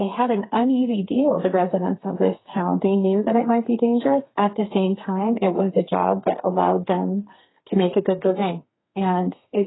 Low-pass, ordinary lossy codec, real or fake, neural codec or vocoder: 7.2 kHz; AAC, 16 kbps; fake; codec, 32 kHz, 1.9 kbps, SNAC